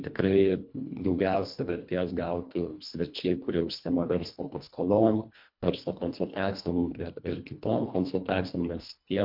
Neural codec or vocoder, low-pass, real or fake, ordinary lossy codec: codec, 24 kHz, 1.5 kbps, HILCodec; 5.4 kHz; fake; MP3, 48 kbps